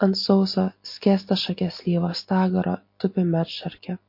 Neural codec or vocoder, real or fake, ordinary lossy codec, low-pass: none; real; MP3, 32 kbps; 5.4 kHz